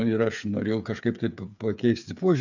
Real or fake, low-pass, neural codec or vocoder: fake; 7.2 kHz; codec, 16 kHz, 8 kbps, FreqCodec, smaller model